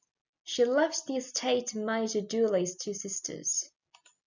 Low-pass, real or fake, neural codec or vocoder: 7.2 kHz; real; none